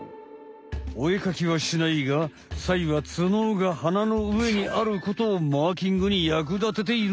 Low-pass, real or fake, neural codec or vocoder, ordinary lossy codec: none; real; none; none